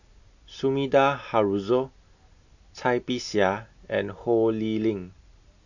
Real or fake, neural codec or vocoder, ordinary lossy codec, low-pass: real; none; none; 7.2 kHz